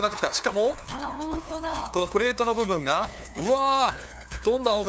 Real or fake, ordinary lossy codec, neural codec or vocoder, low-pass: fake; none; codec, 16 kHz, 2 kbps, FunCodec, trained on LibriTTS, 25 frames a second; none